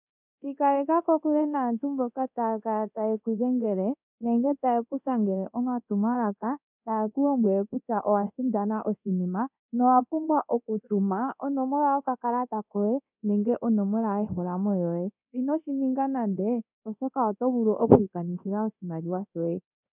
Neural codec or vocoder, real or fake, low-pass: codec, 24 kHz, 0.9 kbps, DualCodec; fake; 3.6 kHz